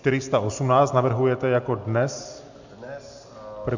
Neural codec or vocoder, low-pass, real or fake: none; 7.2 kHz; real